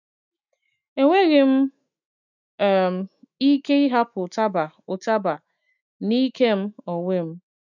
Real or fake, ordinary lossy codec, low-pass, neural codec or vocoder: fake; none; 7.2 kHz; autoencoder, 48 kHz, 128 numbers a frame, DAC-VAE, trained on Japanese speech